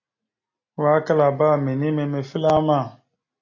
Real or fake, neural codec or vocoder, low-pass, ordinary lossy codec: real; none; 7.2 kHz; MP3, 32 kbps